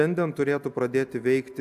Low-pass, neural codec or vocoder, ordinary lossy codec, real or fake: 14.4 kHz; none; AAC, 96 kbps; real